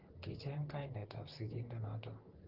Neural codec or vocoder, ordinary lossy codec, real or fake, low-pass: vocoder, 44.1 kHz, 128 mel bands, Pupu-Vocoder; Opus, 16 kbps; fake; 5.4 kHz